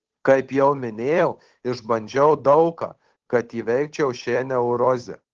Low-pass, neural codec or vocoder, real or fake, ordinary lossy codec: 7.2 kHz; codec, 16 kHz, 8 kbps, FunCodec, trained on Chinese and English, 25 frames a second; fake; Opus, 16 kbps